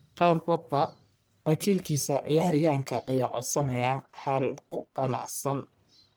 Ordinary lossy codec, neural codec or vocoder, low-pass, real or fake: none; codec, 44.1 kHz, 1.7 kbps, Pupu-Codec; none; fake